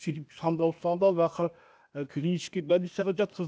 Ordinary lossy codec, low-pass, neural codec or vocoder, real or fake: none; none; codec, 16 kHz, 0.8 kbps, ZipCodec; fake